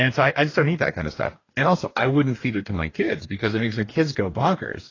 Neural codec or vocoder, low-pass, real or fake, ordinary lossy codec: codec, 44.1 kHz, 2.6 kbps, DAC; 7.2 kHz; fake; AAC, 32 kbps